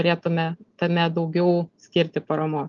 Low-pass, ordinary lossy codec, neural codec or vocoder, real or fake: 7.2 kHz; Opus, 32 kbps; none; real